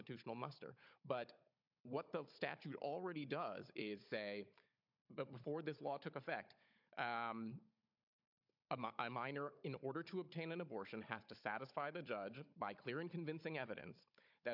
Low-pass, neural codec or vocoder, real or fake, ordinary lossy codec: 5.4 kHz; codec, 16 kHz, 16 kbps, FunCodec, trained on Chinese and English, 50 frames a second; fake; MP3, 48 kbps